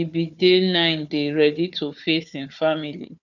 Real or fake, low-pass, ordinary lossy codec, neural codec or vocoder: fake; 7.2 kHz; none; codec, 16 kHz, 4 kbps, FunCodec, trained on Chinese and English, 50 frames a second